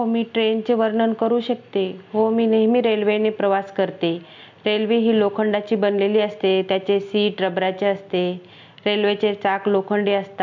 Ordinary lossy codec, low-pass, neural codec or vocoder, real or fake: MP3, 64 kbps; 7.2 kHz; none; real